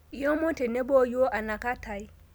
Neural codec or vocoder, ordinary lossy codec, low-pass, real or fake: none; none; none; real